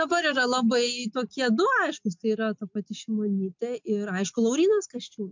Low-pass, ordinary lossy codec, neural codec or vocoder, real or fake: 7.2 kHz; MP3, 64 kbps; none; real